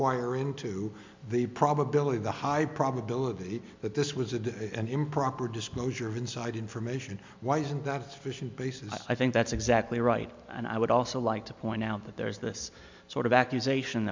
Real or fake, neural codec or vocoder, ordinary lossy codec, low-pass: real; none; MP3, 64 kbps; 7.2 kHz